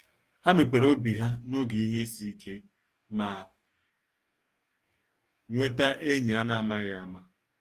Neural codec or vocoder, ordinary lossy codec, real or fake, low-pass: codec, 44.1 kHz, 2.6 kbps, DAC; Opus, 32 kbps; fake; 14.4 kHz